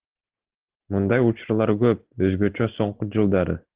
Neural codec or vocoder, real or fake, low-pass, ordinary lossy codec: none; real; 3.6 kHz; Opus, 16 kbps